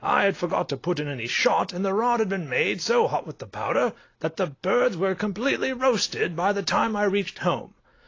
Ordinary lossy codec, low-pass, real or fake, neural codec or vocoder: AAC, 32 kbps; 7.2 kHz; real; none